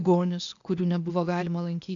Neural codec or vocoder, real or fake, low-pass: codec, 16 kHz, 0.8 kbps, ZipCodec; fake; 7.2 kHz